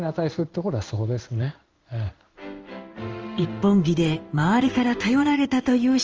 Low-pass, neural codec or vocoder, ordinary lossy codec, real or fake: 7.2 kHz; codec, 16 kHz in and 24 kHz out, 1 kbps, XY-Tokenizer; Opus, 24 kbps; fake